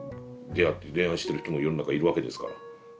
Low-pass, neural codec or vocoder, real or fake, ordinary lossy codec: none; none; real; none